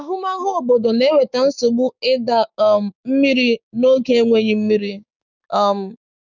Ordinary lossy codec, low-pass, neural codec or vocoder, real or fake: none; 7.2 kHz; codec, 16 kHz, 6 kbps, DAC; fake